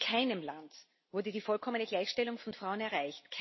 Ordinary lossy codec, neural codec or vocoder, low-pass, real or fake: MP3, 24 kbps; none; 7.2 kHz; real